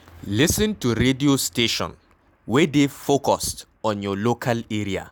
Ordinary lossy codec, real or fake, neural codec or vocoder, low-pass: none; real; none; none